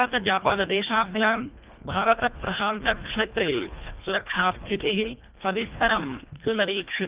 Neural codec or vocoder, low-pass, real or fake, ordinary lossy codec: codec, 24 kHz, 1.5 kbps, HILCodec; 3.6 kHz; fake; Opus, 32 kbps